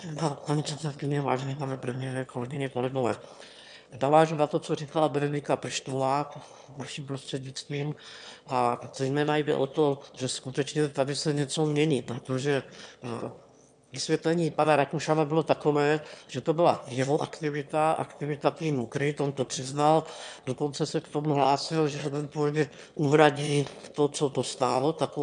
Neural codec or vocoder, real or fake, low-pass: autoencoder, 22.05 kHz, a latent of 192 numbers a frame, VITS, trained on one speaker; fake; 9.9 kHz